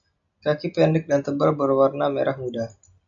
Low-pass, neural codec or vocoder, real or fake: 7.2 kHz; none; real